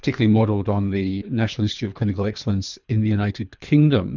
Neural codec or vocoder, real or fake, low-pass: codec, 24 kHz, 3 kbps, HILCodec; fake; 7.2 kHz